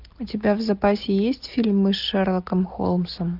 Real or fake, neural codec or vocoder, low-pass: real; none; 5.4 kHz